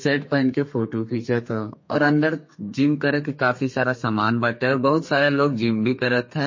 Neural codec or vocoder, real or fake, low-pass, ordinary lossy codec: codec, 32 kHz, 1.9 kbps, SNAC; fake; 7.2 kHz; MP3, 32 kbps